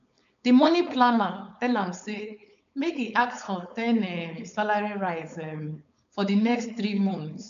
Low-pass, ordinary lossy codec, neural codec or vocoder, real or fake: 7.2 kHz; none; codec, 16 kHz, 4.8 kbps, FACodec; fake